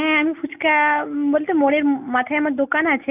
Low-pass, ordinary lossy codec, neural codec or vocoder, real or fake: 3.6 kHz; none; none; real